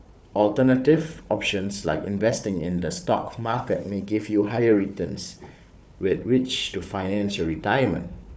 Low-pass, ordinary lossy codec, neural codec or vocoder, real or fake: none; none; codec, 16 kHz, 4 kbps, FunCodec, trained on Chinese and English, 50 frames a second; fake